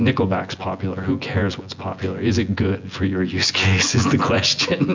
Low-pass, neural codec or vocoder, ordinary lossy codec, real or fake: 7.2 kHz; vocoder, 24 kHz, 100 mel bands, Vocos; MP3, 64 kbps; fake